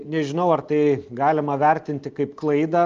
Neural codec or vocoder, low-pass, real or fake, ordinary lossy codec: none; 7.2 kHz; real; Opus, 32 kbps